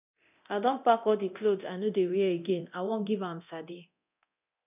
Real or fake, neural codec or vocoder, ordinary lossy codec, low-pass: fake; codec, 24 kHz, 0.9 kbps, DualCodec; none; 3.6 kHz